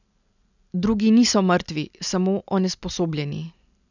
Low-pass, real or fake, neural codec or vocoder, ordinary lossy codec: 7.2 kHz; real; none; none